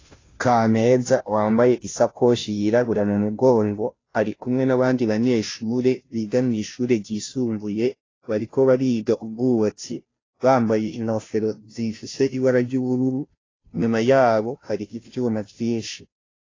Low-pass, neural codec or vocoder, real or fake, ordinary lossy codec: 7.2 kHz; codec, 16 kHz, 0.5 kbps, FunCodec, trained on Chinese and English, 25 frames a second; fake; AAC, 32 kbps